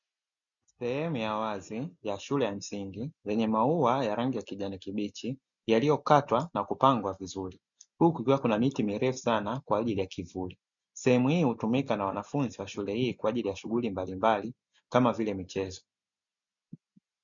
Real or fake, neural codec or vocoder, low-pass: real; none; 7.2 kHz